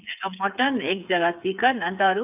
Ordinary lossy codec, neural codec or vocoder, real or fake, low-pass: none; codec, 16 kHz, 8 kbps, FreqCodec, smaller model; fake; 3.6 kHz